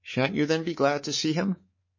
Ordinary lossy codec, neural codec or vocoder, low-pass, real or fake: MP3, 32 kbps; codec, 24 kHz, 3.1 kbps, DualCodec; 7.2 kHz; fake